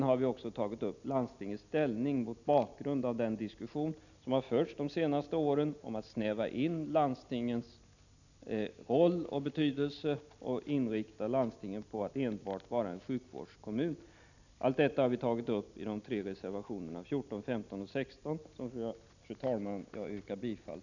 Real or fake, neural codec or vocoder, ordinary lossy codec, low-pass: real; none; none; 7.2 kHz